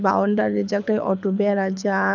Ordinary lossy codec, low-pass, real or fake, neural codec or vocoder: none; 7.2 kHz; fake; codec, 24 kHz, 6 kbps, HILCodec